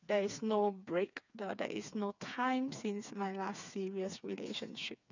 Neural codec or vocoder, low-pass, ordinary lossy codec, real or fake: codec, 16 kHz, 4 kbps, FreqCodec, smaller model; 7.2 kHz; none; fake